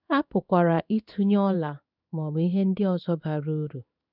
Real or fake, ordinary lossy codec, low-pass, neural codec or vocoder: fake; none; 5.4 kHz; codec, 16 kHz in and 24 kHz out, 1 kbps, XY-Tokenizer